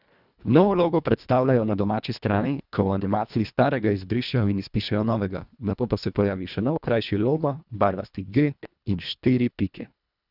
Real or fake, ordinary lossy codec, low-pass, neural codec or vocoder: fake; none; 5.4 kHz; codec, 24 kHz, 1.5 kbps, HILCodec